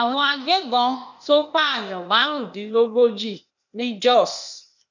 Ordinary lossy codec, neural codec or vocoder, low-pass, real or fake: none; codec, 16 kHz, 0.8 kbps, ZipCodec; 7.2 kHz; fake